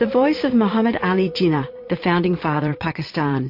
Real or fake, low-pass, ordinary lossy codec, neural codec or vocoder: fake; 5.4 kHz; MP3, 32 kbps; vocoder, 22.05 kHz, 80 mel bands, Vocos